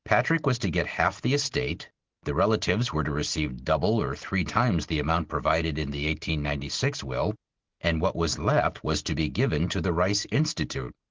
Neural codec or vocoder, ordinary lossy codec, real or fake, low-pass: none; Opus, 16 kbps; real; 7.2 kHz